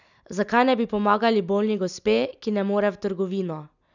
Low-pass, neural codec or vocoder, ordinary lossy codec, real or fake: 7.2 kHz; none; none; real